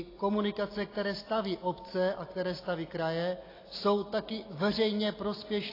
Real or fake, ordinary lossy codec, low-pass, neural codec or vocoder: real; AAC, 24 kbps; 5.4 kHz; none